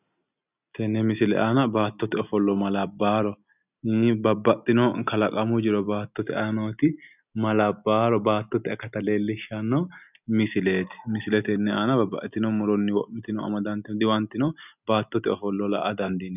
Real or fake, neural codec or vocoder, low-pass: real; none; 3.6 kHz